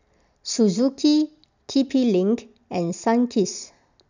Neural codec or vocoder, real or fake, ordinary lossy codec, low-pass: none; real; none; 7.2 kHz